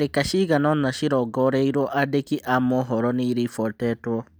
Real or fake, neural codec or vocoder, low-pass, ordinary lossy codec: real; none; none; none